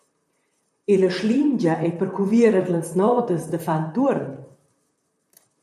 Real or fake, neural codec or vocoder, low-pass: fake; vocoder, 44.1 kHz, 128 mel bands, Pupu-Vocoder; 14.4 kHz